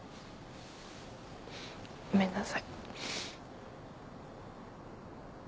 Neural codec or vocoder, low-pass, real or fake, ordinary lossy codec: none; none; real; none